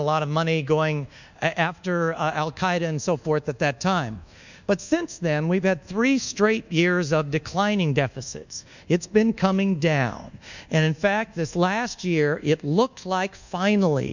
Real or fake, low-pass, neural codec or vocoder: fake; 7.2 kHz; codec, 24 kHz, 1.2 kbps, DualCodec